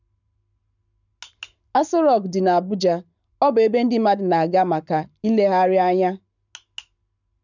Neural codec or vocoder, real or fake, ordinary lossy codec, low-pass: codec, 44.1 kHz, 7.8 kbps, Pupu-Codec; fake; none; 7.2 kHz